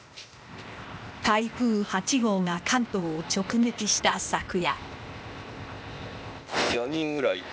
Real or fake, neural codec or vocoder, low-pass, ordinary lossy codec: fake; codec, 16 kHz, 0.8 kbps, ZipCodec; none; none